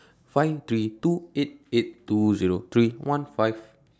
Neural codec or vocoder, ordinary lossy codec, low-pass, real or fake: none; none; none; real